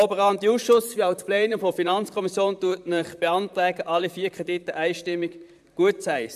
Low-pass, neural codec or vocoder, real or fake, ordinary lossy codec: 14.4 kHz; vocoder, 44.1 kHz, 128 mel bands, Pupu-Vocoder; fake; AAC, 96 kbps